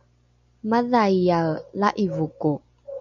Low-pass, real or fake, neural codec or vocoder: 7.2 kHz; real; none